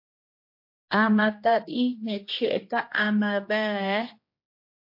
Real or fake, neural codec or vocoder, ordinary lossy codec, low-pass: fake; codec, 16 kHz, 1 kbps, X-Codec, HuBERT features, trained on general audio; MP3, 32 kbps; 5.4 kHz